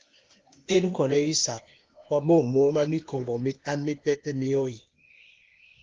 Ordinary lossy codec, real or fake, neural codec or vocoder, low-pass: Opus, 32 kbps; fake; codec, 16 kHz, 0.8 kbps, ZipCodec; 7.2 kHz